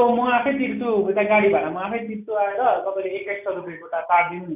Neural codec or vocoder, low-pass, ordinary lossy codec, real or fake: none; 3.6 kHz; none; real